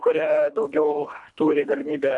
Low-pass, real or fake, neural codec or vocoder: 10.8 kHz; fake; codec, 24 kHz, 1.5 kbps, HILCodec